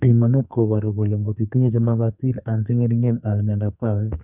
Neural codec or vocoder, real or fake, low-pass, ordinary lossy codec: codec, 44.1 kHz, 2.6 kbps, SNAC; fake; 3.6 kHz; none